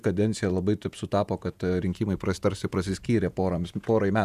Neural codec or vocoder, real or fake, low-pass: vocoder, 48 kHz, 128 mel bands, Vocos; fake; 14.4 kHz